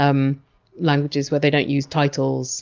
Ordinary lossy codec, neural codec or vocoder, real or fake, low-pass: Opus, 24 kbps; none; real; 7.2 kHz